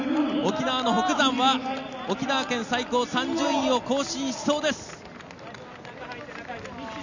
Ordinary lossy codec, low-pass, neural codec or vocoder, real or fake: none; 7.2 kHz; none; real